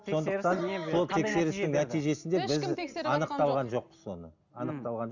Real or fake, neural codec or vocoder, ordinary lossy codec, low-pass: real; none; none; 7.2 kHz